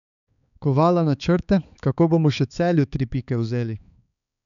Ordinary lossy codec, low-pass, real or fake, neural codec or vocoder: MP3, 96 kbps; 7.2 kHz; fake; codec, 16 kHz, 4 kbps, X-Codec, HuBERT features, trained on balanced general audio